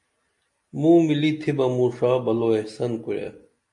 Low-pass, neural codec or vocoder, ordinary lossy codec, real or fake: 10.8 kHz; none; MP3, 48 kbps; real